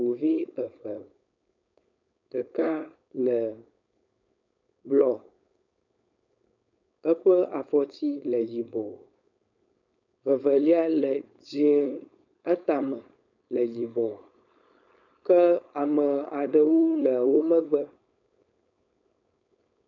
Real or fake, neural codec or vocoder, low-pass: fake; codec, 16 kHz, 4.8 kbps, FACodec; 7.2 kHz